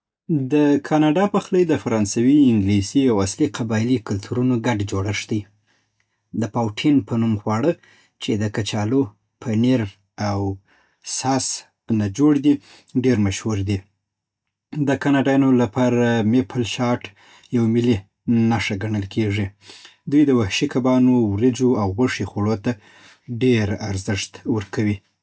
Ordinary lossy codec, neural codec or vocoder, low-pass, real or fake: none; none; none; real